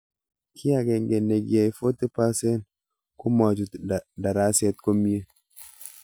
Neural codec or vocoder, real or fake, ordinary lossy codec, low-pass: none; real; none; none